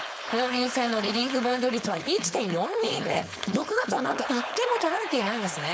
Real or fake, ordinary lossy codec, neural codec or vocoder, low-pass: fake; none; codec, 16 kHz, 4.8 kbps, FACodec; none